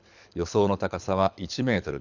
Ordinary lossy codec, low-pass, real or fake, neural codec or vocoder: none; 7.2 kHz; fake; codec, 24 kHz, 6 kbps, HILCodec